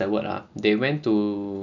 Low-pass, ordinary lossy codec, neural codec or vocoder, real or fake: 7.2 kHz; none; none; real